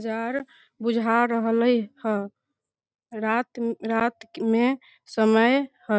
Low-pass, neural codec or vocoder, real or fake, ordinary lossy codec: none; none; real; none